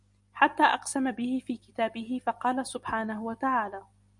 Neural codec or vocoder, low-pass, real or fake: none; 10.8 kHz; real